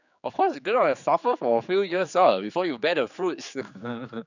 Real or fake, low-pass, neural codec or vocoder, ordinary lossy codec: fake; 7.2 kHz; codec, 16 kHz, 4 kbps, X-Codec, HuBERT features, trained on general audio; none